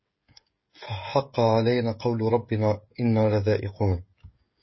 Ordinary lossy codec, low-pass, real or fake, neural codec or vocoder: MP3, 24 kbps; 7.2 kHz; fake; codec, 16 kHz, 16 kbps, FreqCodec, smaller model